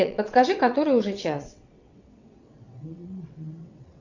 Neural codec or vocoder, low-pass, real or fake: vocoder, 22.05 kHz, 80 mel bands, WaveNeXt; 7.2 kHz; fake